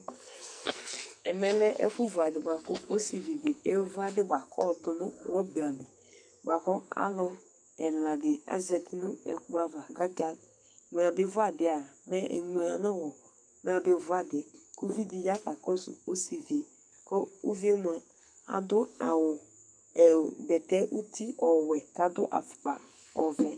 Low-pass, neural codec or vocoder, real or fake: 9.9 kHz; codec, 44.1 kHz, 2.6 kbps, SNAC; fake